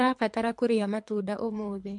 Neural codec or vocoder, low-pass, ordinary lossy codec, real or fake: codec, 24 kHz, 3 kbps, HILCodec; 10.8 kHz; MP3, 64 kbps; fake